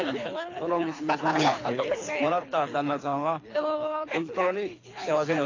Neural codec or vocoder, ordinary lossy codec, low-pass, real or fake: codec, 24 kHz, 3 kbps, HILCodec; AAC, 32 kbps; 7.2 kHz; fake